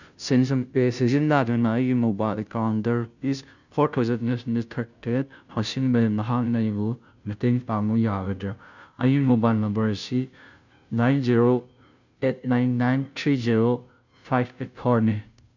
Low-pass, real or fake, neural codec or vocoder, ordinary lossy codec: 7.2 kHz; fake; codec, 16 kHz, 0.5 kbps, FunCodec, trained on Chinese and English, 25 frames a second; none